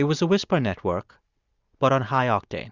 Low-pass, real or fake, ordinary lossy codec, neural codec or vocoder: 7.2 kHz; real; Opus, 64 kbps; none